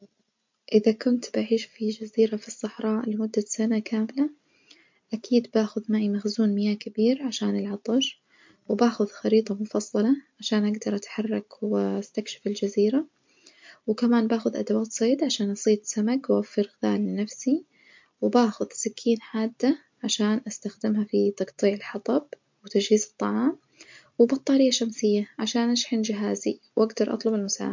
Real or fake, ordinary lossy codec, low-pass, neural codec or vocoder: real; MP3, 48 kbps; 7.2 kHz; none